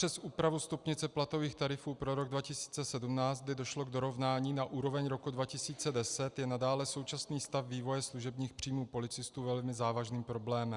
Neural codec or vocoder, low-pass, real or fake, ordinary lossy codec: none; 10.8 kHz; real; Opus, 64 kbps